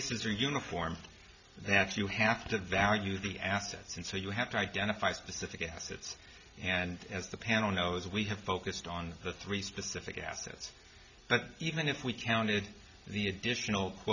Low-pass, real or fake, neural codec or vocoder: 7.2 kHz; real; none